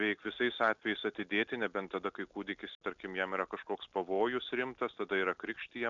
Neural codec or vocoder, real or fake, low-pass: none; real; 7.2 kHz